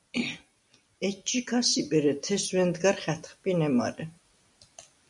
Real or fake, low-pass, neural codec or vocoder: real; 10.8 kHz; none